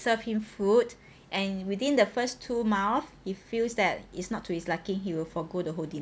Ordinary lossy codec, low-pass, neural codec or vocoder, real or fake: none; none; none; real